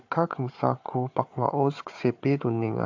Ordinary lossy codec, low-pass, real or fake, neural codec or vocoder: AAC, 48 kbps; 7.2 kHz; fake; codec, 16 kHz, 4 kbps, FunCodec, trained on Chinese and English, 50 frames a second